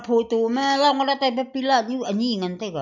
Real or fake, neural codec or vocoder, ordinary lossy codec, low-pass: real; none; none; 7.2 kHz